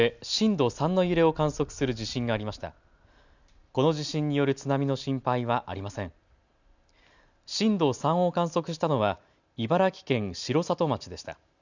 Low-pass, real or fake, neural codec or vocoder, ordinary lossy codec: 7.2 kHz; real; none; none